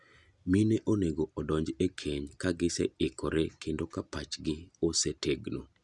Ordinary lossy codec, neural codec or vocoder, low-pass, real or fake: none; none; 10.8 kHz; real